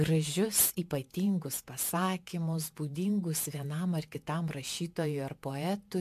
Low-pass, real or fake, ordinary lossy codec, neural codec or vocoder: 14.4 kHz; fake; AAC, 96 kbps; vocoder, 48 kHz, 128 mel bands, Vocos